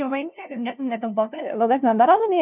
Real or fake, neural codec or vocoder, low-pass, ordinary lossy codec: fake; codec, 16 kHz, 0.5 kbps, FunCodec, trained on LibriTTS, 25 frames a second; 3.6 kHz; none